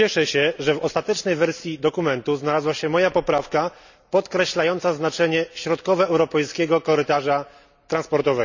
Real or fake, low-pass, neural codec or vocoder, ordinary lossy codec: real; 7.2 kHz; none; none